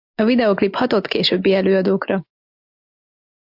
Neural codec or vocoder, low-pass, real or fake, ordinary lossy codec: none; 5.4 kHz; real; MP3, 48 kbps